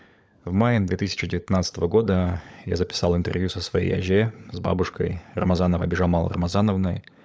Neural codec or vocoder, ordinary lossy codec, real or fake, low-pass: codec, 16 kHz, 16 kbps, FunCodec, trained on LibriTTS, 50 frames a second; none; fake; none